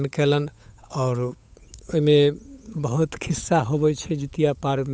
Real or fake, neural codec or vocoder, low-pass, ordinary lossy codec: fake; codec, 16 kHz, 8 kbps, FunCodec, trained on Chinese and English, 25 frames a second; none; none